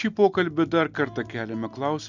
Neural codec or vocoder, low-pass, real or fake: none; 7.2 kHz; real